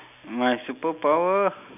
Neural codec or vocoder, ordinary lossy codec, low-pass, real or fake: none; none; 3.6 kHz; real